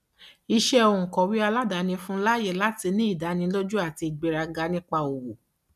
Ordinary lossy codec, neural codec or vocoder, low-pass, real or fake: none; none; 14.4 kHz; real